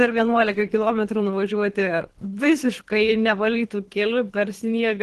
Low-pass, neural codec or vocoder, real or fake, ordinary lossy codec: 10.8 kHz; codec, 24 kHz, 3 kbps, HILCodec; fake; Opus, 16 kbps